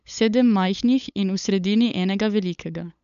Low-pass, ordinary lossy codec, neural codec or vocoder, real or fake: 7.2 kHz; none; codec, 16 kHz, 8 kbps, FunCodec, trained on LibriTTS, 25 frames a second; fake